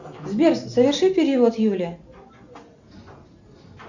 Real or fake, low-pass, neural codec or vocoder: real; 7.2 kHz; none